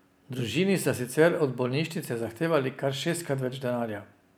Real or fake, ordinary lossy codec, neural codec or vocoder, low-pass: real; none; none; none